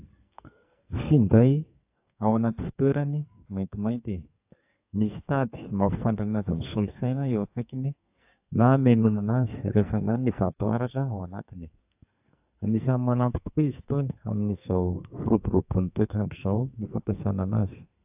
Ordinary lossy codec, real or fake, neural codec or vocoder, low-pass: AAC, 32 kbps; fake; codec, 44.1 kHz, 2.6 kbps, SNAC; 3.6 kHz